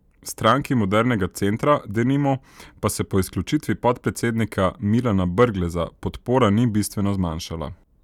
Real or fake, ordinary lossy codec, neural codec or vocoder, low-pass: real; none; none; 19.8 kHz